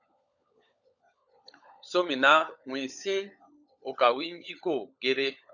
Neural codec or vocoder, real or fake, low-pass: codec, 16 kHz, 16 kbps, FunCodec, trained on LibriTTS, 50 frames a second; fake; 7.2 kHz